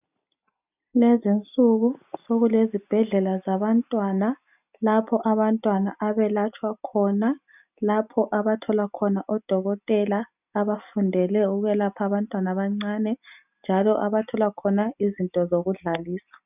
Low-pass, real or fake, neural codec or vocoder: 3.6 kHz; real; none